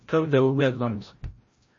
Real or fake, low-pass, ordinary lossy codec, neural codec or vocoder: fake; 7.2 kHz; MP3, 32 kbps; codec, 16 kHz, 0.5 kbps, FreqCodec, larger model